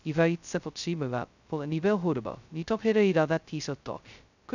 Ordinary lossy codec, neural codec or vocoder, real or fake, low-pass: none; codec, 16 kHz, 0.2 kbps, FocalCodec; fake; 7.2 kHz